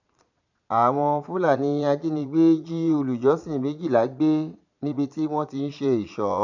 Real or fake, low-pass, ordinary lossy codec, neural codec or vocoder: fake; 7.2 kHz; none; vocoder, 22.05 kHz, 80 mel bands, Vocos